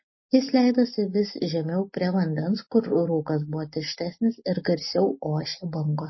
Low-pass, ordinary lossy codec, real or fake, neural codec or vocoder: 7.2 kHz; MP3, 24 kbps; real; none